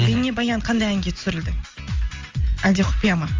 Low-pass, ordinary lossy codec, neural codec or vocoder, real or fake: 7.2 kHz; Opus, 32 kbps; none; real